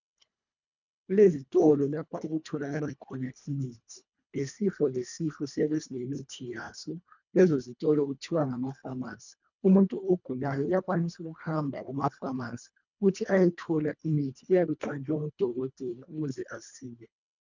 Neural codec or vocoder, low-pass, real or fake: codec, 24 kHz, 1.5 kbps, HILCodec; 7.2 kHz; fake